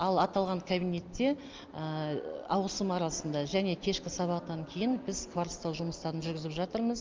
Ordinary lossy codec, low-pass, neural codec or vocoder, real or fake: Opus, 24 kbps; 7.2 kHz; none; real